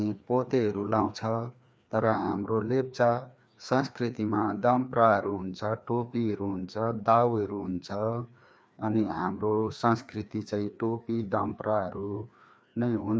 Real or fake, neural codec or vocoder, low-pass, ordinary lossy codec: fake; codec, 16 kHz, 2 kbps, FreqCodec, larger model; none; none